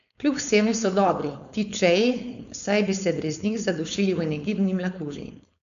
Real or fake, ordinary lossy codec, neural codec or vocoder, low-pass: fake; none; codec, 16 kHz, 4.8 kbps, FACodec; 7.2 kHz